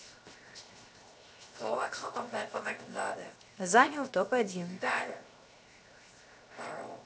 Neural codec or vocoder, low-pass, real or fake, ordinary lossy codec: codec, 16 kHz, 0.7 kbps, FocalCodec; none; fake; none